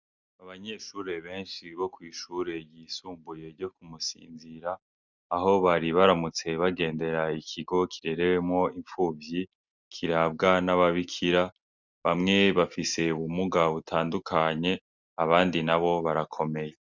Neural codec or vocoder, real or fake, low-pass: none; real; 7.2 kHz